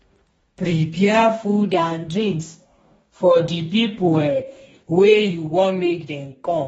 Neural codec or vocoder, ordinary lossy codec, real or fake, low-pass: codec, 44.1 kHz, 2.6 kbps, DAC; AAC, 24 kbps; fake; 19.8 kHz